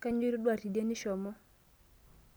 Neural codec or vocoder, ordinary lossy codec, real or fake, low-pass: none; none; real; none